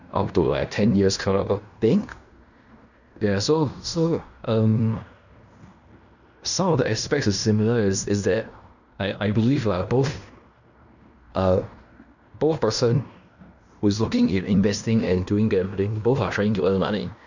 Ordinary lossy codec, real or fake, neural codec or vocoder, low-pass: none; fake; codec, 16 kHz in and 24 kHz out, 0.9 kbps, LongCat-Audio-Codec, fine tuned four codebook decoder; 7.2 kHz